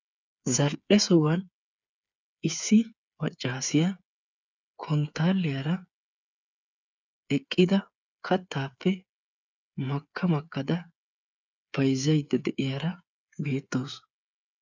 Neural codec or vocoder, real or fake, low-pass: codec, 16 kHz, 8 kbps, FreqCodec, smaller model; fake; 7.2 kHz